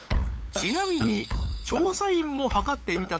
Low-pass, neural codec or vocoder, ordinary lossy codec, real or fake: none; codec, 16 kHz, 8 kbps, FunCodec, trained on LibriTTS, 25 frames a second; none; fake